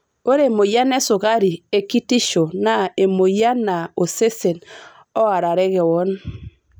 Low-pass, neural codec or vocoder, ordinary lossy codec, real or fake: none; none; none; real